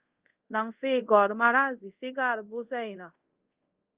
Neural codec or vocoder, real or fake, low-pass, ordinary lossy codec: codec, 24 kHz, 0.5 kbps, DualCodec; fake; 3.6 kHz; Opus, 24 kbps